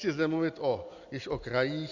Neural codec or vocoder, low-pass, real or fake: none; 7.2 kHz; real